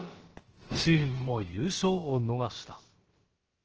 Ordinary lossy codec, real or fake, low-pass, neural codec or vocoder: Opus, 16 kbps; fake; 7.2 kHz; codec, 16 kHz, about 1 kbps, DyCAST, with the encoder's durations